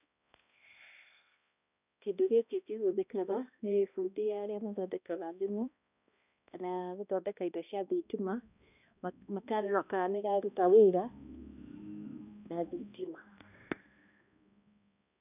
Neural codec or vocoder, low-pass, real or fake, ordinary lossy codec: codec, 16 kHz, 1 kbps, X-Codec, HuBERT features, trained on balanced general audio; 3.6 kHz; fake; none